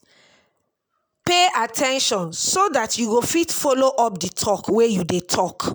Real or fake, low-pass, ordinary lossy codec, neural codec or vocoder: real; none; none; none